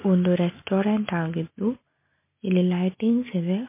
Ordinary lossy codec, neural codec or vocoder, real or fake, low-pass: MP3, 24 kbps; none; real; 3.6 kHz